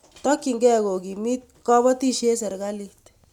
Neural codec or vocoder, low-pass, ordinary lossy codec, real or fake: none; 19.8 kHz; none; real